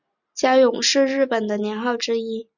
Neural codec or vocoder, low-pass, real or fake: none; 7.2 kHz; real